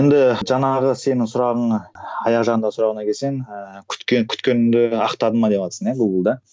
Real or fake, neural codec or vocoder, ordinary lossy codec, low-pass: real; none; none; none